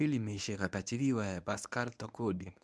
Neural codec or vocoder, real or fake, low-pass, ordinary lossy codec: codec, 24 kHz, 0.9 kbps, WavTokenizer, medium speech release version 1; fake; none; none